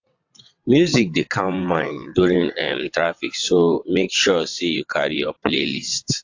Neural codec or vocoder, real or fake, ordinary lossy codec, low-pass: vocoder, 22.05 kHz, 80 mel bands, WaveNeXt; fake; AAC, 48 kbps; 7.2 kHz